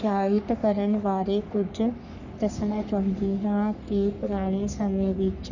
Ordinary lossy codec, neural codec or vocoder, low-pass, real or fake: none; codec, 44.1 kHz, 3.4 kbps, Pupu-Codec; 7.2 kHz; fake